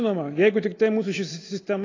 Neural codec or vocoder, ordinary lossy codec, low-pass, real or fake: none; AAC, 32 kbps; 7.2 kHz; real